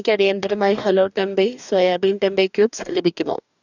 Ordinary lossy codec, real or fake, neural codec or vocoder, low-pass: none; fake; codec, 44.1 kHz, 2.6 kbps, DAC; 7.2 kHz